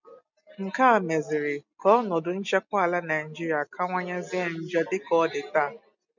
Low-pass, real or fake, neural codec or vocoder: 7.2 kHz; real; none